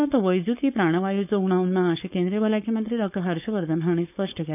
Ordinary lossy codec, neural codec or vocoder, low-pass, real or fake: none; codec, 16 kHz, 4.8 kbps, FACodec; 3.6 kHz; fake